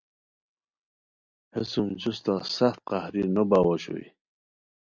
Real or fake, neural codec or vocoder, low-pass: real; none; 7.2 kHz